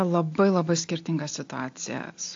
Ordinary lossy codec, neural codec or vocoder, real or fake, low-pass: AAC, 48 kbps; none; real; 7.2 kHz